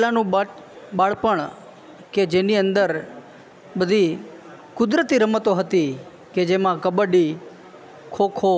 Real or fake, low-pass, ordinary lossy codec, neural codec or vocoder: real; none; none; none